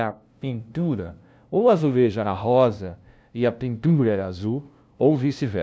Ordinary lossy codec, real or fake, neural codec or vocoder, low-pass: none; fake; codec, 16 kHz, 0.5 kbps, FunCodec, trained on LibriTTS, 25 frames a second; none